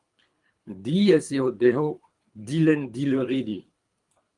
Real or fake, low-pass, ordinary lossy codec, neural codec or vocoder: fake; 10.8 kHz; Opus, 32 kbps; codec, 24 kHz, 3 kbps, HILCodec